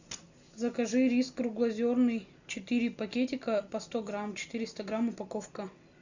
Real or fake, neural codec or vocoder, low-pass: real; none; 7.2 kHz